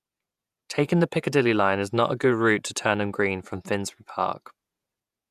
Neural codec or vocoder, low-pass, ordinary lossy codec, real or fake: vocoder, 48 kHz, 128 mel bands, Vocos; 14.4 kHz; none; fake